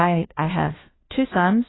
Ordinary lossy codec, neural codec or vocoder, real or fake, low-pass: AAC, 16 kbps; codec, 16 kHz, 1 kbps, FunCodec, trained on LibriTTS, 50 frames a second; fake; 7.2 kHz